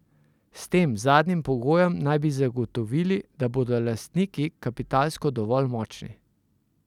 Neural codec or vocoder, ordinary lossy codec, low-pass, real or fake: none; none; 19.8 kHz; real